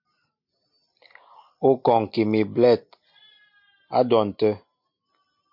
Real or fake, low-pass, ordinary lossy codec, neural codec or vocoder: real; 5.4 kHz; MP3, 48 kbps; none